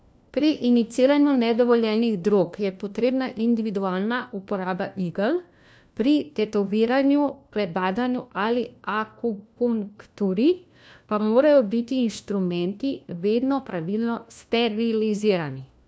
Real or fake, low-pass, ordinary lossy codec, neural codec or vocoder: fake; none; none; codec, 16 kHz, 1 kbps, FunCodec, trained on LibriTTS, 50 frames a second